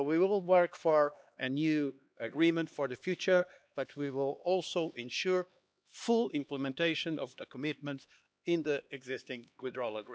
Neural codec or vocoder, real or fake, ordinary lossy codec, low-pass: codec, 16 kHz, 2 kbps, X-Codec, HuBERT features, trained on LibriSpeech; fake; none; none